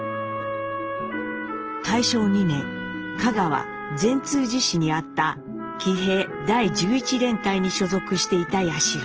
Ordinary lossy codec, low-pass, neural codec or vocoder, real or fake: Opus, 16 kbps; 7.2 kHz; none; real